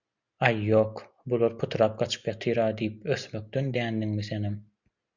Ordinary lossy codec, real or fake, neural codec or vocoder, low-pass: Opus, 64 kbps; real; none; 7.2 kHz